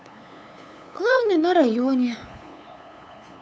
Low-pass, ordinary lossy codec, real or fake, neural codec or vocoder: none; none; fake; codec, 16 kHz, 8 kbps, FunCodec, trained on LibriTTS, 25 frames a second